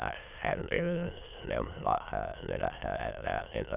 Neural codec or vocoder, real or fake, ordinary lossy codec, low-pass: autoencoder, 22.05 kHz, a latent of 192 numbers a frame, VITS, trained on many speakers; fake; none; 3.6 kHz